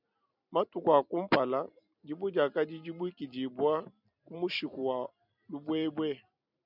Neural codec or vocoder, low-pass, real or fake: vocoder, 44.1 kHz, 128 mel bands every 256 samples, BigVGAN v2; 5.4 kHz; fake